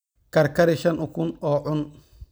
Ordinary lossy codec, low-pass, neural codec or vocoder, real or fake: none; none; none; real